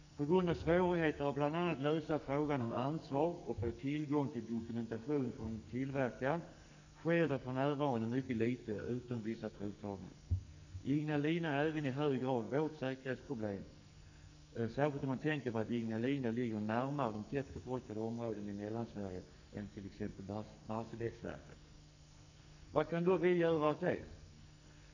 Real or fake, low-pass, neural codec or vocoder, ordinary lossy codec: fake; 7.2 kHz; codec, 44.1 kHz, 2.6 kbps, SNAC; none